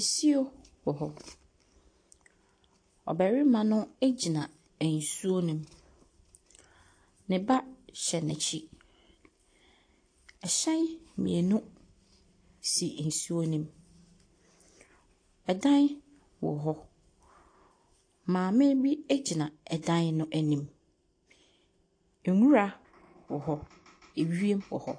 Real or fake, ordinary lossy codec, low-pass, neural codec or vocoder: real; AAC, 48 kbps; 9.9 kHz; none